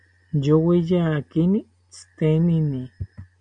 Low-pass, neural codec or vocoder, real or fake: 9.9 kHz; none; real